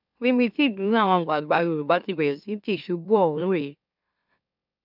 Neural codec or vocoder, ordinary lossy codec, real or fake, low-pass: autoencoder, 44.1 kHz, a latent of 192 numbers a frame, MeloTTS; none; fake; 5.4 kHz